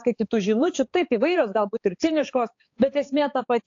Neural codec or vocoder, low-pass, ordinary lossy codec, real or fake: codec, 16 kHz, 4 kbps, X-Codec, HuBERT features, trained on balanced general audio; 7.2 kHz; AAC, 48 kbps; fake